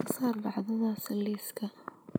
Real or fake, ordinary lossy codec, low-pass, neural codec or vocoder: real; none; none; none